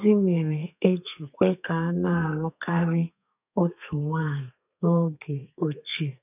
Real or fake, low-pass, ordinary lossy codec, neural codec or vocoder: fake; 3.6 kHz; none; codec, 32 kHz, 1.9 kbps, SNAC